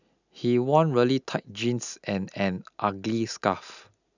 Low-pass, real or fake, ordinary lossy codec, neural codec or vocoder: 7.2 kHz; real; none; none